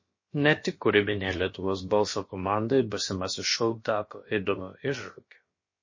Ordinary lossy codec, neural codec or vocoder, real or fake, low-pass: MP3, 32 kbps; codec, 16 kHz, about 1 kbps, DyCAST, with the encoder's durations; fake; 7.2 kHz